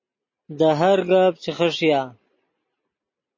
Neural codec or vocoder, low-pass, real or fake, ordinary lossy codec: none; 7.2 kHz; real; MP3, 32 kbps